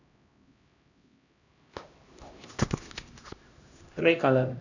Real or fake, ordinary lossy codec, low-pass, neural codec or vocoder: fake; none; 7.2 kHz; codec, 16 kHz, 1 kbps, X-Codec, HuBERT features, trained on LibriSpeech